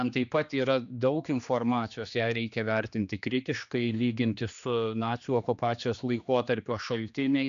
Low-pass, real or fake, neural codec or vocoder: 7.2 kHz; fake; codec, 16 kHz, 2 kbps, X-Codec, HuBERT features, trained on general audio